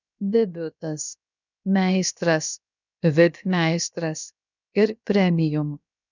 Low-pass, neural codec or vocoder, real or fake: 7.2 kHz; codec, 16 kHz, about 1 kbps, DyCAST, with the encoder's durations; fake